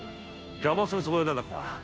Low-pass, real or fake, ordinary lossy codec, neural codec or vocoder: none; fake; none; codec, 16 kHz, 0.5 kbps, FunCodec, trained on Chinese and English, 25 frames a second